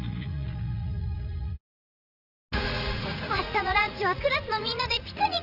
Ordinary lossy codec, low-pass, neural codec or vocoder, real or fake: none; 5.4 kHz; vocoder, 44.1 kHz, 80 mel bands, Vocos; fake